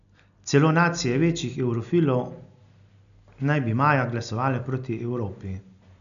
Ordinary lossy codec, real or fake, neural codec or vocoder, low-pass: none; real; none; 7.2 kHz